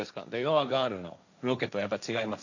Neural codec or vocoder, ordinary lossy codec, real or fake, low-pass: codec, 16 kHz, 1.1 kbps, Voila-Tokenizer; none; fake; 7.2 kHz